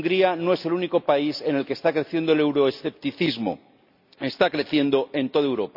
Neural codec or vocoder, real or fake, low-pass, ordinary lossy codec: none; real; 5.4 kHz; none